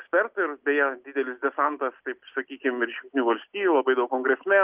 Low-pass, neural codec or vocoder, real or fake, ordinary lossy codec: 3.6 kHz; none; real; Opus, 24 kbps